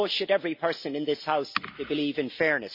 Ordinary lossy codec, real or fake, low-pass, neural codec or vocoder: MP3, 32 kbps; real; 5.4 kHz; none